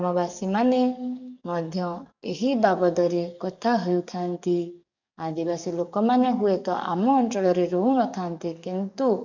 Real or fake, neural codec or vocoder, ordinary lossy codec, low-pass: fake; codec, 44.1 kHz, 7.8 kbps, DAC; none; 7.2 kHz